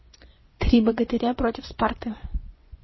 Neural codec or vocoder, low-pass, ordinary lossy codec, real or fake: vocoder, 24 kHz, 100 mel bands, Vocos; 7.2 kHz; MP3, 24 kbps; fake